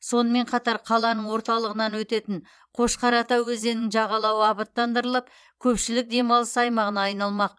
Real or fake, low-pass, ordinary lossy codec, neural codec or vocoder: fake; none; none; vocoder, 22.05 kHz, 80 mel bands, Vocos